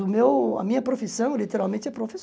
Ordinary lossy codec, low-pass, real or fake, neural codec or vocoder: none; none; real; none